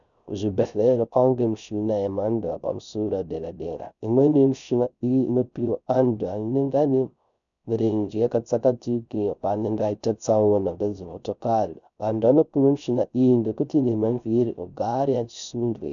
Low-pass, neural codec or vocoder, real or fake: 7.2 kHz; codec, 16 kHz, 0.3 kbps, FocalCodec; fake